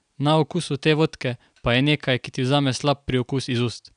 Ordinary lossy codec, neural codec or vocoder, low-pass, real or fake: none; none; 9.9 kHz; real